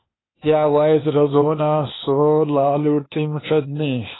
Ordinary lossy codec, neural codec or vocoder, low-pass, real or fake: AAC, 16 kbps; codec, 16 kHz, 0.8 kbps, ZipCodec; 7.2 kHz; fake